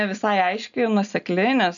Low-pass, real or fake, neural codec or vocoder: 7.2 kHz; real; none